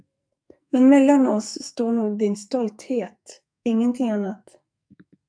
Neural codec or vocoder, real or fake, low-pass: codec, 44.1 kHz, 2.6 kbps, SNAC; fake; 9.9 kHz